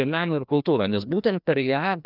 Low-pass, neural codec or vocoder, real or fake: 5.4 kHz; codec, 16 kHz, 1 kbps, FreqCodec, larger model; fake